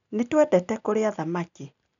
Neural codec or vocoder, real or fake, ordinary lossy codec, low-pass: none; real; none; 7.2 kHz